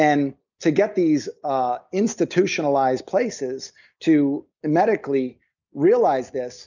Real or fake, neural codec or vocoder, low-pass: real; none; 7.2 kHz